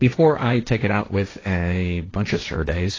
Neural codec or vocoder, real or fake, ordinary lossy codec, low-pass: codec, 16 kHz, 1.1 kbps, Voila-Tokenizer; fake; AAC, 32 kbps; 7.2 kHz